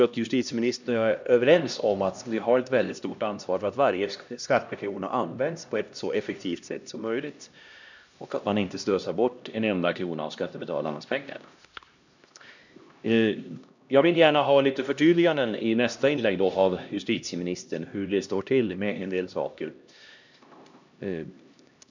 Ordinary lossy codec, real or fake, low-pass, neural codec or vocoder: none; fake; 7.2 kHz; codec, 16 kHz, 1 kbps, X-Codec, HuBERT features, trained on LibriSpeech